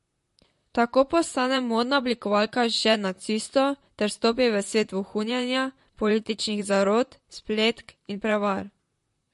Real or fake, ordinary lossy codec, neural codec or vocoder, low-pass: fake; MP3, 48 kbps; vocoder, 44.1 kHz, 128 mel bands, Pupu-Vocoder; 14.4 kHz